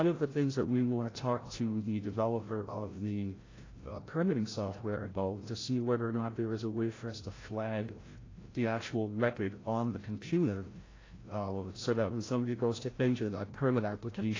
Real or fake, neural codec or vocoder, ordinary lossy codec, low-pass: fake; codec, 16 kHz, 0.5 kbps, FreqCodec, larger model; AAC, 32 kbps; 7.2 kHz